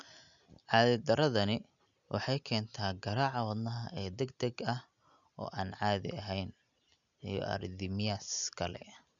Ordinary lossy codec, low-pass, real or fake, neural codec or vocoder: none; 7.2 kHz; real; none